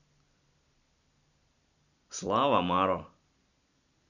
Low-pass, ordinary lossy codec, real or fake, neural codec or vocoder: 7.2 kHz; none; fake; vocoder, 44.1 kHz, 128 mel bands every 256 samples, BigVGAN v2